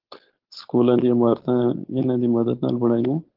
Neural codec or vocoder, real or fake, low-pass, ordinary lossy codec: codec, 16 kHz, 4.8 kbps, FACodec; fake; 5.4 kHz; Opus, 16 kbps